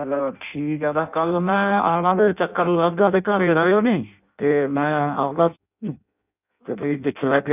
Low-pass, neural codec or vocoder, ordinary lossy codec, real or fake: 3.6 kHz; codec, 16 kHz in and 24 kHz out, 0.6 kbps, FireRedTTS-2 codec; none; fake